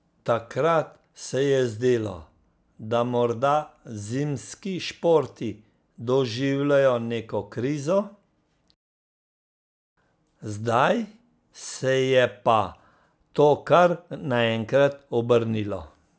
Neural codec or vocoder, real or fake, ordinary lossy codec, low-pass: none; real; none; none